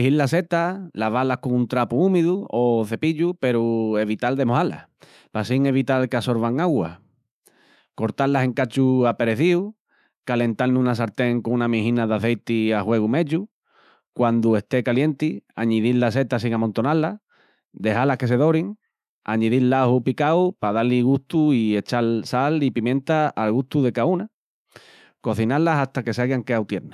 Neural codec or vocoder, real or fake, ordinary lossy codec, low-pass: autoencoder, 48 kHz, 128 numbers a frame, DAC-VAE, trained on Japanese speech; fake; none; 14.4 kHz